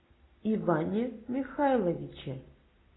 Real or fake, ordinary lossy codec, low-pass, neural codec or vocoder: real; AAC, 16 kbps; 7.2 kHz; none